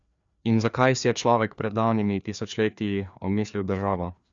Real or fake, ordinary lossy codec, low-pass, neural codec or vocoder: fake; Opus, 64 kbps; 7.2 kHz; codec, 16 kHz, 2 kbps, FreqCodec, larger model